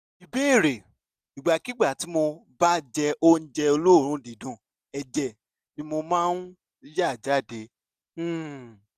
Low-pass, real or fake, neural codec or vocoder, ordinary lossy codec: 14.4 kHz; real; none; none